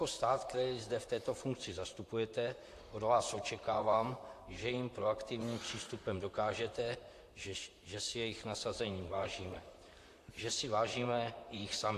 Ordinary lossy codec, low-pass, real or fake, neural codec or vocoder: AAC, 64 kbps; 14.4 kHz; fake; vocoder, 44.1 kHz, 128 mel bands, Pupu-Vocoder